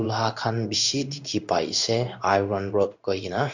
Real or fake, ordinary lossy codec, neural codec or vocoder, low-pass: fake; none; codec, 16 kHz in and 24 kHz out, 1 kbps, XY-Tokenizer; 7.2 kHz